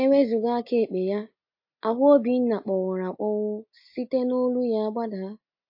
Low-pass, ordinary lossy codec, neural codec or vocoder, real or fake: 5.4 kHz; MP3, 32 kbps; none; real